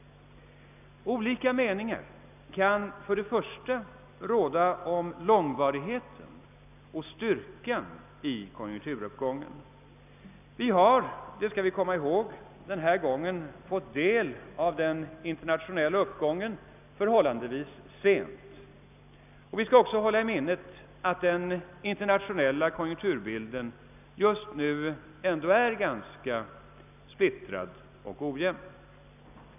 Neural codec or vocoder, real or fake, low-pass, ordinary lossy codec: none; real; 3.6 kHz; none